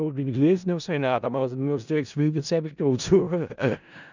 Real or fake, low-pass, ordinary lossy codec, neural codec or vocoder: fake; 7.2 kHz; none; codec, 16 kHz in and 24 kHz out, 0.4 kbps, LongCat-Audio-Codec, four codebook decoder